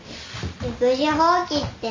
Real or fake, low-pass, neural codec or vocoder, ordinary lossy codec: real; 7.2 kHz; none; MP3, 48 kbps